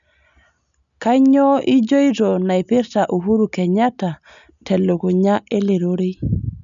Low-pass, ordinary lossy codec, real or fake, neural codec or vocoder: 7.2 kHz; none; real; none